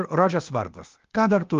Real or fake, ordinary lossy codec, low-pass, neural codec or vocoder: fake; Opus, 32 kbps; 7.2 kHz; codec, 16 kHz, 0.8 kbps, ZipCodec